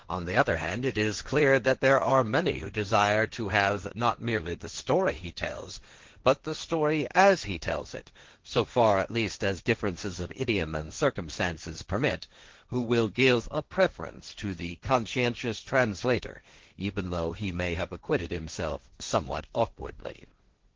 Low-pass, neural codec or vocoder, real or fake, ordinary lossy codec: 7.2 kHz; codec, 16 kHz, 1.1 kbps, Voila-Tokenizer; fake; Opus, 16 kbps